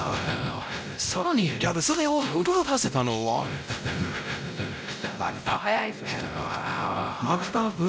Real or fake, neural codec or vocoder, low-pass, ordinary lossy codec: fake; codec, 16 kHz, 0.5 kbps, X-Codec, WavLM features, trained on Multilingual LibriSpeech; none; none